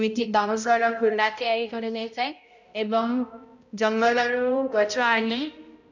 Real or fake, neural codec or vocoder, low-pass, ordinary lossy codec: fake; codec, 16 kHz, 0.5 kbps, X-Codec, HuBERT features, trained on balanced general audio; 7.2 kHz; none